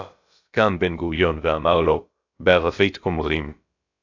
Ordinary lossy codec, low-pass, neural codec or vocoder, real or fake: AAC, 48 kbps; 7.2 kHz; codec, 16 kHz, about 1 kbps, DyCAST, with the encoder's durations; fake